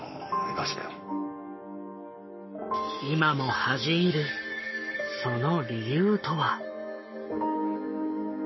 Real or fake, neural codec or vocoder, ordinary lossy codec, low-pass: fake; codec, 44.1 kHz, 7.8 kbps, Pupu-Codec; MP3, 24 kbps; 7.2 kHz